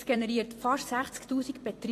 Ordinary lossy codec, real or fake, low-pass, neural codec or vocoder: AAC, 48 kbps; fake; 14.4 kHz; vocoder, 44.1 kHz, 128 mel bands every 256 samples, BigVGAN v2